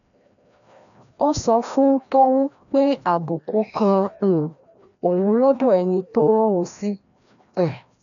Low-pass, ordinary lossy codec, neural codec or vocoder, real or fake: 7.2 kHz; none; codec, 16 kHz, 1 kbps, FreqCodec, larger model; fake